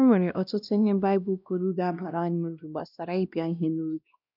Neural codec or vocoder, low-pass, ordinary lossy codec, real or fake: codec, 16 kHz, 1 kbps, X-Codec, WavLM features, trained on Multilingual LibriSpeech; 5.4 kHz; none; fake